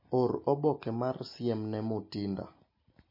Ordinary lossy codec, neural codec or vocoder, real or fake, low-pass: MP3, 24 kbps; none; real; 5.4 kHz